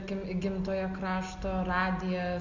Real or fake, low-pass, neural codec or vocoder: real; 7.2 kHz; none